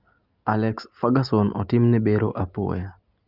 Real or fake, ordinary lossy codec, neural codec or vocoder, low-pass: real; Opus, 24 kbps; none; 5.4 kHz